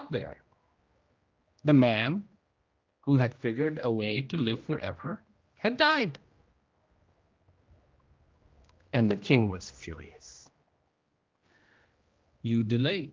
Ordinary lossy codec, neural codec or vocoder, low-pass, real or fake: Opus, 32 kbps; codec, 16 kHz, 1 kbps, X-Codec, HuBERT features, trained on general audio; 7.2 kHz; fake